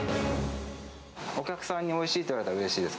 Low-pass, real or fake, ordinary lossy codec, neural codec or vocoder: none; real; none; none